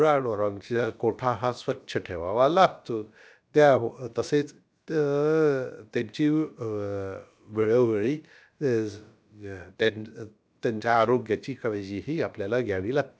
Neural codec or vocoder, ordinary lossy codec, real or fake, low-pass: codec, 16 kHz, about 1 kbps, DyCAST, with the encoder's durations; none; fake; none